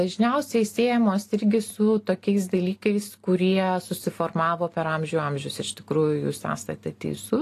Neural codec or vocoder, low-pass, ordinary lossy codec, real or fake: none; 14.4 kHz; AAC, 48 kbps; real